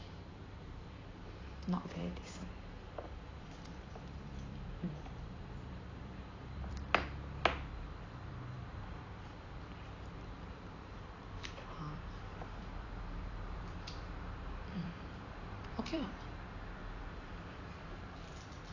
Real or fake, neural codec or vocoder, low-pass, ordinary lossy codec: fake; vocoder, 44.1 kHz, 128 mel bands every 256 samples, BigVGAN v2; 7.2 kHz; AAC, 32 kbps